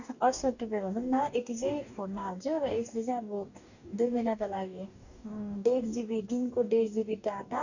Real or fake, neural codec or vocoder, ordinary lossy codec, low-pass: fake; codec, 44.1 kHz, 2.6 kbps, DAC; none; 7.2 kHz